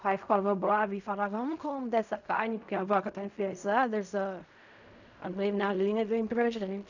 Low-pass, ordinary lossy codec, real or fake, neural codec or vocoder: 7.2 kHz; none; fake; codec, 16 kHz in and 24 kHz out, 0.4 kbps, LongCat-Audio-Codec, fine tuned four codebook decoder